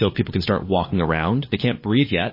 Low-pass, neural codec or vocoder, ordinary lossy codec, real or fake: 5.4 kHz; codec, 16 kHz, 4 kbps, FunCodec, trained on LibriTTS, 50 frames a second; MP3, 24 kbps; fake